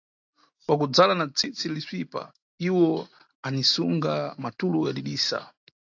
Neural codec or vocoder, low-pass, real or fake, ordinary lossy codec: none; 7.2 kHz; real; AAC, 48 kbps